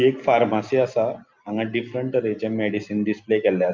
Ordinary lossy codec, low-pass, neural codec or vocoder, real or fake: Opus, 24 kbps; 7.2 kHz; none; real